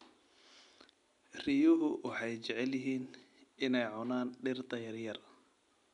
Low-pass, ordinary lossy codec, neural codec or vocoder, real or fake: 10.8 kHz; MP3, 96 kbps; none; real